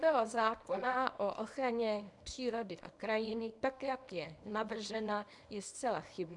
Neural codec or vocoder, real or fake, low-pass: codec, 24 kHz, 0.9 kbps, WavTokenizer, small release; fake; 10.8 kHz